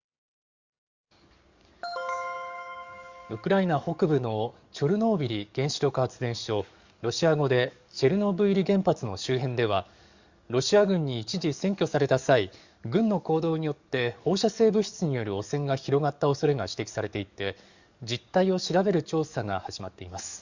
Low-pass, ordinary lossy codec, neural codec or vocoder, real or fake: 7.2 kHz; Opus, 64 kbps; codec, 44.1 kHz, 7.8 kbps, DAC; fake